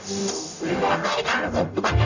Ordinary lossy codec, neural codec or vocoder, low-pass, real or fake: none; codec, 44.1 kHz, 0.9 kbps, DAC; 7.2 kHz; fake